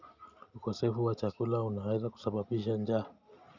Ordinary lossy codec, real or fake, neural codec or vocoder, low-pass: none; real; none; 7.2 kHz